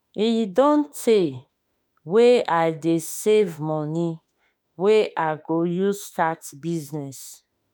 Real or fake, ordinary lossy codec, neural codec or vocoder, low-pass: fake; none; autoencoder, 48 kHz, 32 numbers a frame, DAC-VAE, trained on Japanese speech; none